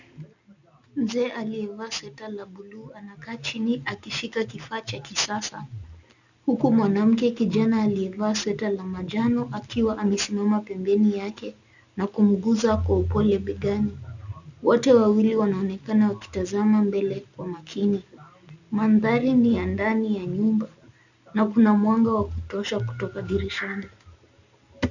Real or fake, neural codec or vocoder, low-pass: real; none; 7.2 kHz